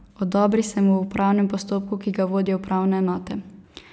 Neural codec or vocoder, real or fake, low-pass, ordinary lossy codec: none; real; none; none